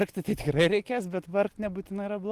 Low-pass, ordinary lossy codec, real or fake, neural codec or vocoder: 14.4 kHz; Opus, 24 kbps; real; none